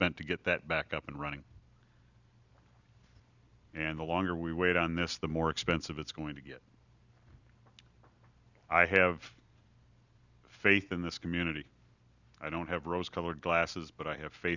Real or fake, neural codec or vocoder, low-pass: real; none; 7.2 kHz